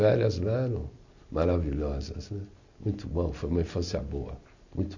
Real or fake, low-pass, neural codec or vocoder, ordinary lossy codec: real; 7.2 kHz; none; none